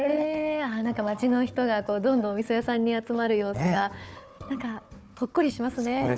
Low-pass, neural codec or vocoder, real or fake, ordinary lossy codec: none; codec, 16 kHz, 4 kbps, FunCodec, trained on Chinese and English, 50 frames a second; fake; none